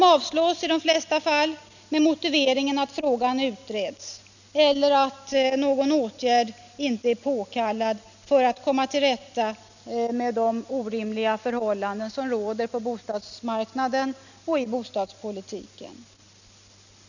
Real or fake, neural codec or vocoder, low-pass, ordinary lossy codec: real; none; 7.2 kHz; none